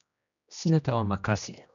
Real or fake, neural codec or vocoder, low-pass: fake; codec, 16 kHz, 1 kbps, X-Codec, HuBERT features, trained on general audio; 7.2 kHz